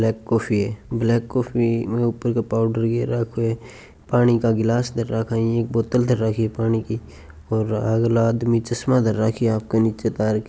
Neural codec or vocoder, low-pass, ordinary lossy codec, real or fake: none; none; none; real